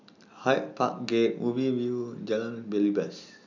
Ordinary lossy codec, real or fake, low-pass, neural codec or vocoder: none; real; 7.2 kHz; none